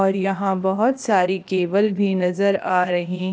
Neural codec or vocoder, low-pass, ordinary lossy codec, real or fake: codec, 16 kHz, 0.8 kbps, ZipCodec; none; none; fake